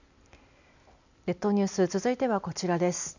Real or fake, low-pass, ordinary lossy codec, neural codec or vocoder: real; 7.2 kHz; none; none